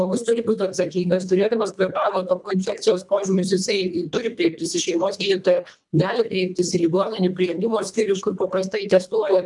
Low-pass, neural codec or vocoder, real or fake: 10.8 kHz; codec, 24 kHz, 1.5 kbps, HILCodec; fake